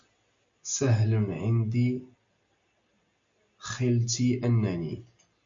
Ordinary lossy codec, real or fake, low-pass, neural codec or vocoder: MP3, 48 kbps; real; 7.2 kHz; none